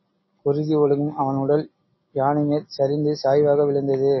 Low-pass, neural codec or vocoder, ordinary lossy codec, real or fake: 7.2 kHz; none; MP3, 24 kbps; real